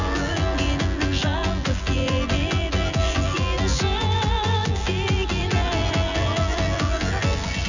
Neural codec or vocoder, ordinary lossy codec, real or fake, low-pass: vocoder, 24 kHz, 100 mel bands, Vocos; none; fake; 7.2 kHz